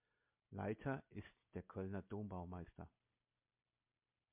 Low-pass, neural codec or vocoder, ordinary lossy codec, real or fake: 3.6 kHz; none; MP3, 32 kbps; real